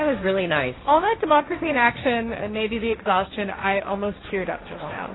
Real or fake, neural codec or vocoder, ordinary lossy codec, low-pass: fake; codec, 16 kHz, 1.1 kbps, Voila-Tokenizer; AAC, 16 kbps; 7.2 kHz